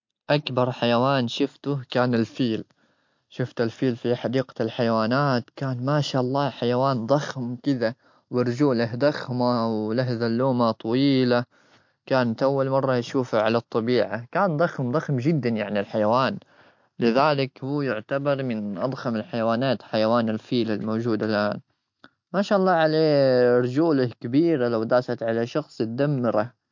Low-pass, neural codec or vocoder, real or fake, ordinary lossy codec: 7.2 kHz; none; real; MP3, 48 kbps